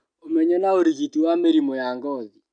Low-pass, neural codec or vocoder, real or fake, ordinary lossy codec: 9.9 kHz; none; real; none